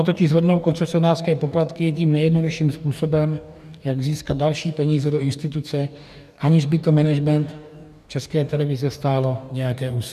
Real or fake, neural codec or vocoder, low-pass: fake; codec, 44.1 kHz, 2.6 kbps, DAC; 14.4 kHz